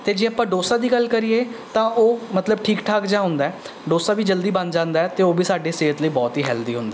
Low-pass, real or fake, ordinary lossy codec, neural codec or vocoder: none; real; none; none